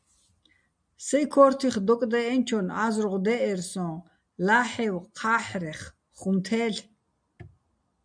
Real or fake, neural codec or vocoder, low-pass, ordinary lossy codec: real; none; 9.9 kHz; MP3, 96 kbps